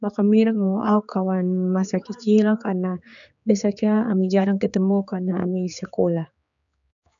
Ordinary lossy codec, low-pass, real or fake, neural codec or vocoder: none; 7.2 kHz; fake; codec, 16 kHz, 4 kbps, X-Codec, HuBERT features, trained on general audio